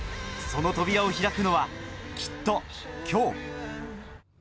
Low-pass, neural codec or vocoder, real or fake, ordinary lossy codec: none; none; real; none